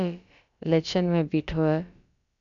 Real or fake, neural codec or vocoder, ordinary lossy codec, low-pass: fake; codec, 16 kHz, about 1 kbps, DyCAST, with the encoder's durations; MP3, 96 kbps; 7.2 kHz